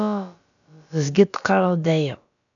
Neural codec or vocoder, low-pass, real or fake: codec, 16 kHz, about 1 kbps, DyCAST, with the encoder's durations; 7.2 kHz; fake